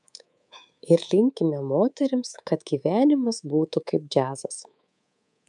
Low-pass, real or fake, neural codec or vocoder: 10.8 kHz; fake; codec, 24 kHz, 3.1 kbps, DualCodec